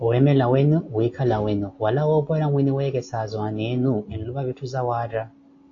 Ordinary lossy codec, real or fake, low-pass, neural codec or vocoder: AAC, 48 kbps; real; 7.2 kHz; none